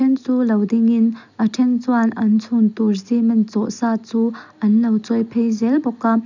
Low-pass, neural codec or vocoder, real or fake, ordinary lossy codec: 7.2 kHz; none; real; none